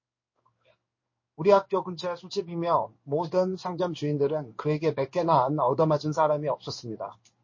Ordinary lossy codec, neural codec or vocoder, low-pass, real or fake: MP3, 32 kbps; codec, 16 kHz in and 24 kHz out, 1 kbps, XY-Tokenizer; 7.2 kHz; fake